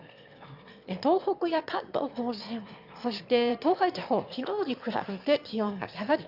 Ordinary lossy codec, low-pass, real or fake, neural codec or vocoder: Opus, 64 kbps; 5.4 kHz; fake; autoencoder, 22.05 kHz, a latent of 192 numbers a frame, VITS, trained on one speaker